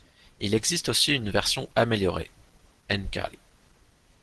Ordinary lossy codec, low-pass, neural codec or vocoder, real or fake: Opus, 16 kbps; 10.8 kHz; none; real